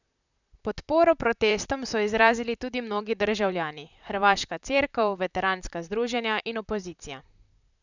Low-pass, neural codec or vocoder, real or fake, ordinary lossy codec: 7.2 kHz; vocoder, 44.1 kHz, 128 mel bands, Pupu-Vocoder; fake; none